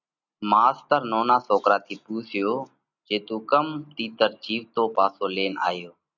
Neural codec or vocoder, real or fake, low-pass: none; real; 7.2 kHz